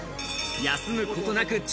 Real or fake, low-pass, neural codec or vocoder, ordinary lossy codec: real; none; none; none